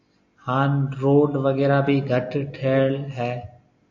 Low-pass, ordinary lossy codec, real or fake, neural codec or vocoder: 7.2 kHz; AAC, 48 kbps; real; none